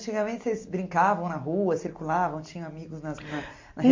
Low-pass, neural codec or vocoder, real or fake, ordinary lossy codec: 7.2 kHz; none; real; MP3, 48 kbps